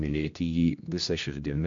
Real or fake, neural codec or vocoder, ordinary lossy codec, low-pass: fake; codec, 16 kHz, 1 kbps, X-Codec, HuBERT features, trained on general audio; AAC, 64 kbps; 7.2 kHz